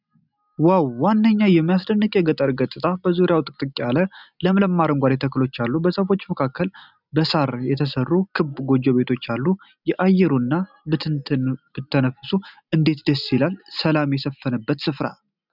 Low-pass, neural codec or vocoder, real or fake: 5.4 kHz; none; real